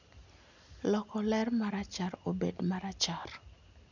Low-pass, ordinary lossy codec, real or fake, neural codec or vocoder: 7.2 kHz; none; real; none